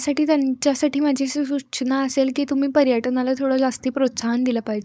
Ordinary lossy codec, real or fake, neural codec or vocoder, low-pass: none; fake; codec, 16 kHz, 4.8 kbps, FACodec; none